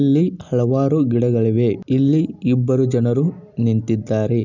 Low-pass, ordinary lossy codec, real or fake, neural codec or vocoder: 7.2 kHz; none; real; none